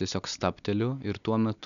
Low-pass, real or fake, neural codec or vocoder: 7.2 kHz; real; none